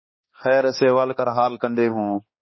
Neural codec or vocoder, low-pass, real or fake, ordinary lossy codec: codec, 16 kHz, 2 kbps, X-Codec, HuBERT features, trained on balanced general audio; 7.2 kHz; fake; MP3, 24 kbps